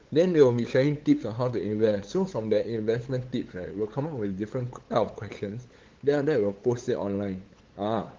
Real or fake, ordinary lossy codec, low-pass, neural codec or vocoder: fake; Opus, 16 kbps; 7.2 kHz; codec, 16 kHz, 8 kbps, FunCodec, trained on LibriTTS, 25 frames a second